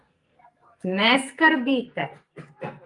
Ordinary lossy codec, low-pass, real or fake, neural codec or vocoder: Opus, 32 kbps; 10.8 kHz; fake; codec, 44.1 kHz, 2.6 kbps, SNAC